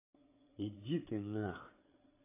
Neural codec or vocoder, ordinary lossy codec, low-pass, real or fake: codec, 16 kHz, 8 kbps, FreqCodec, larger model; AAC, 24 kbps; 3.6 kHz; fake